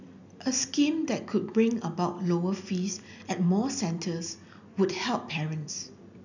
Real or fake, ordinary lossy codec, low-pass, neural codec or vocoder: real; none; 7.2 kHz; none